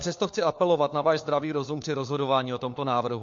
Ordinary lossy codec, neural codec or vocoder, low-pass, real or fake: MP3, 48 kbps; codec, 16 kHz, 4 kbps, FunCodec, trained on LibriTTS, 50 frames a second; 7.2 kHz; fake